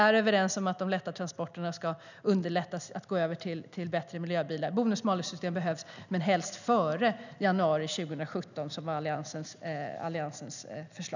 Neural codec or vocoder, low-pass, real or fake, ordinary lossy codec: none; 7.2 kHz; real; none